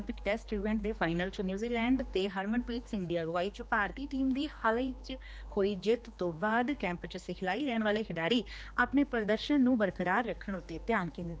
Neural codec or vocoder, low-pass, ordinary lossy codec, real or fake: codec, 16 kHz, 2 kbps, X-Codec, HuBERT features, trained on general audio; none; none; fake